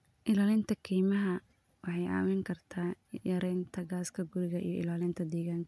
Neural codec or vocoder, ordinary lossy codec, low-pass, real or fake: none; none; none; real